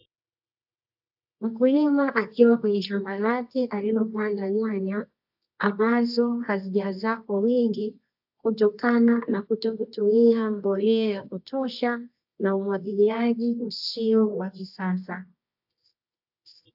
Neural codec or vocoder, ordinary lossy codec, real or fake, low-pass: codec, 24 kHz, 0.9 kbps, WavTokenizer, medium music audio release; AAC, 48 kbps; fake; 5.4 kHz